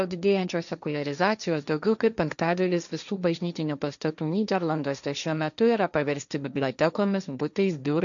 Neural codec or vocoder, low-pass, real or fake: codec, 16 kHz, 1.1 kbps, Voila-Tokenizer; 7.2 kHz; fake